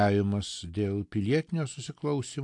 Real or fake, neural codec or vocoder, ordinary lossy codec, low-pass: real; none; AAC, 64 kbps; 10.8 kHz